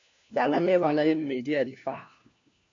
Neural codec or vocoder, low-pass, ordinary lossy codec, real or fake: codec, 16 kHz, 1 kbps, FunCodec, trained on LibriTTS, 50 frames a second; 7.2 kHz; AAC, 48 kbps; fake